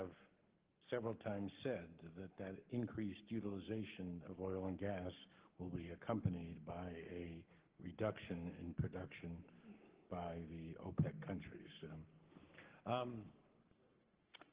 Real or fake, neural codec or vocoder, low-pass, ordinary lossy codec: fake; codec, 44.1 kHz, 7.8 kbps, Pupu-Codec; 3.6 kHz; Opus, 16 kbps